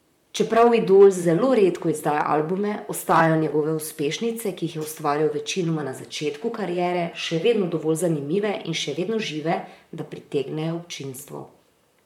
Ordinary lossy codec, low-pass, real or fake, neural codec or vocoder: MP3, 96 kbps; 19.8 kHz; fake; vocoder, 44.1 kHz, 128 mel bands, Pupu-Vocoder